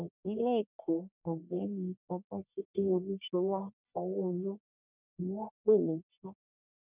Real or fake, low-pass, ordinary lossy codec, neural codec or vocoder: fake; 3.6 kHz; none; codec, 44.1 kHz, 1.7 kbps, Pupu-Codec